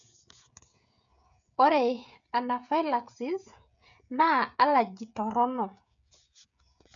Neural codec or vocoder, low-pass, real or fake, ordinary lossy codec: codec, 16 kHz, 16 kbps, FreqCodec, smaller model; 7.2 kHz; fake; none